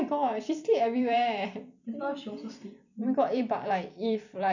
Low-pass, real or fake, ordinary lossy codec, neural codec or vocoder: 7.2 kHz; fake; none; vocoder, 44.1 kHz, 128 mel bands every 256 samples, BigVGAN v2